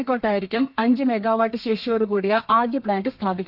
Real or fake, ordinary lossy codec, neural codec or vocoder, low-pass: fake; none; codec, 32 kHz, 1.9 kbps, SNAC; 5.4 kHz